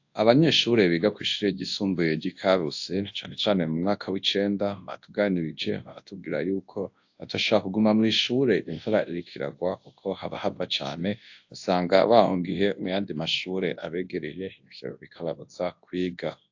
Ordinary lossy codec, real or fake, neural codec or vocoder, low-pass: AAC, 48 kbps; fake; codec, 24 kHz, 0.9 kbps, WavTokenizer, large speech release; 7.2 kHz